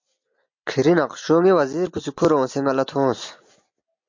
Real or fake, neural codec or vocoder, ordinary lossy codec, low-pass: real; none; MP3, 48 kbps; 7.2 kHz